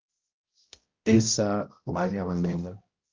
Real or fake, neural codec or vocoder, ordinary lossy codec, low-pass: fake; codec, 16 kHz, 0.5 kbps, X-Codec, HuBERT features, trained on balanced general audio; Opus, 24 kbps; 7.2 kHz